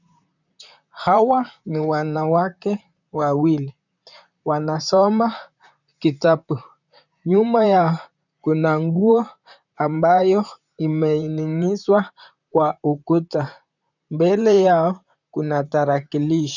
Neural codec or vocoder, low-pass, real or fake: vocoder, 44.1 kHz, 128 mel bands, Pupu-Vocoder; 7.2 kHz; fake